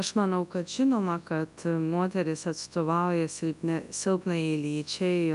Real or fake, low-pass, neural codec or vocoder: fake; 10.8 kHz; codec, 24 kHz, 0.9 kbps, WavTokenizer, large speech release